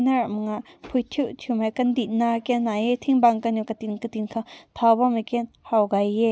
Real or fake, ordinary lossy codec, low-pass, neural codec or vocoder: real; none; none; none